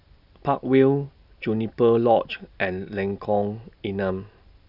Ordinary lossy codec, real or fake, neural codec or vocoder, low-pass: none; real; none; 5.4 kHz